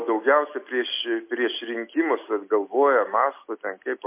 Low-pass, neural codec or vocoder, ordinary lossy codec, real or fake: 3.6 kHz; none; MP3, 24 kbps; real